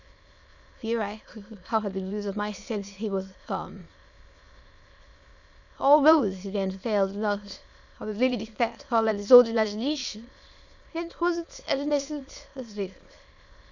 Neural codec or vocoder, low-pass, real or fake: autoencoder, 22.05 kHz, a latent of 192 numbers a frame, VITS, trained on many speakers; 7.2 kHz; fake